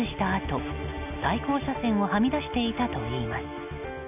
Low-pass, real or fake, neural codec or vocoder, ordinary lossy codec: 3.6 kHz; real; none; none